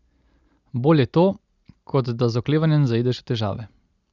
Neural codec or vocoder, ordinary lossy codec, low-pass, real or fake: none; Opus, 64 kbps; 7.2 kHz; real